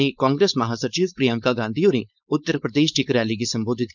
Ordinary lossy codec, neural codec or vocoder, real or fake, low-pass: none; codec, 16 kHz, 4.8 kbps, FACodec; fake; 7.2 kHz